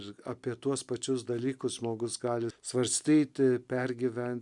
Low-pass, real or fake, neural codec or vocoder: 10.8 kHz; real; none